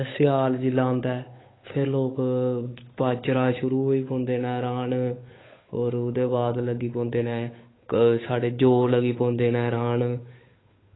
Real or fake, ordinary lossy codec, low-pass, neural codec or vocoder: fake; AAC, 16 kbps; 7.2 kHz; codec, 16 kHz, 8 kbps, FunCodec, trained on Chinese and English, 25 frames a second